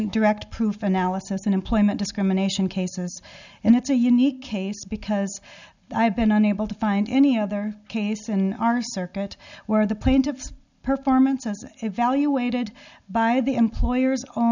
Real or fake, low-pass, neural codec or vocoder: real; 7.2 kHz; none